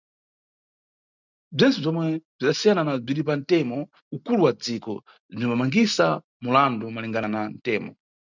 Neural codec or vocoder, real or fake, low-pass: none; real; 7.2 kHz